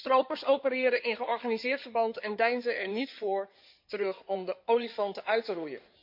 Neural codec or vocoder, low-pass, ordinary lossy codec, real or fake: codec, 16 kHz in and 24 kHz out, 2.2 kbps, FireRedTTS-2 codec; 5.4 kHz; MP3, 48 kbps; fake